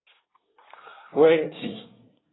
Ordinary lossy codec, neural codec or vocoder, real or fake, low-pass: AAC, 16 kbps; codec, 24 kHz, 1 kbps, SNAC; fake; 7.2 kHz